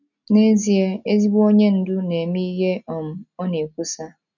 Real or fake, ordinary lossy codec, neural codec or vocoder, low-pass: real; none; none; 7.2 kHz